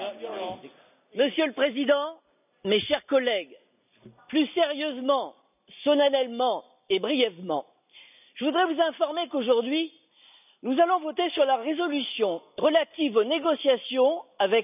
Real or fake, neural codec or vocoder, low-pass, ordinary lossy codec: real; none; 3.6 kHz; none